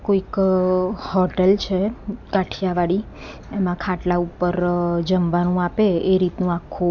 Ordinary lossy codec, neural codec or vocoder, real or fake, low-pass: none; none; real; 7.2 kHz